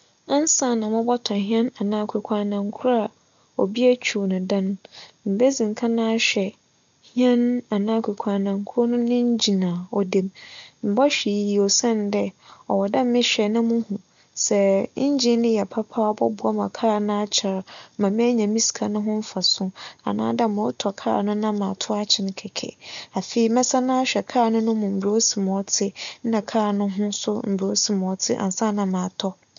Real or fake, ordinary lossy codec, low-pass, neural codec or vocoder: real; none; 7.2 kHz; none